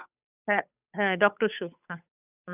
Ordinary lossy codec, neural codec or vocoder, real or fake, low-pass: none; codec, 16 kHz, 8 kbps, FunCodec, trained on Chinese and English, 25 frames a second; fake; 3.6 kHz